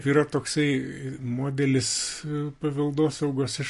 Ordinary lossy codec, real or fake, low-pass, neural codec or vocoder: MP3, 48 kbps; real; 14.4 kHz; none